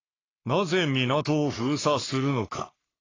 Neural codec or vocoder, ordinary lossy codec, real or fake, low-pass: codec, 44.1 kHz, 3.4 kbps, Pupu-Codec; AAC, 32 kbps; fake; 7.2 kHz